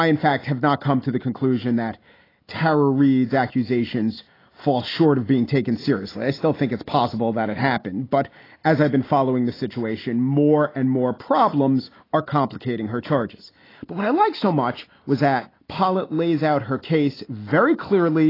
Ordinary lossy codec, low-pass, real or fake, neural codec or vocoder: AAC, 24 kbps; 5.4 kHz; real; none